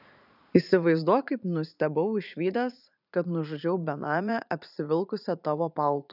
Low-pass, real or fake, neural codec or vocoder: 5.4 kHz; fake; vocoder, 44.1 kHz, 80 mel bands, Vocos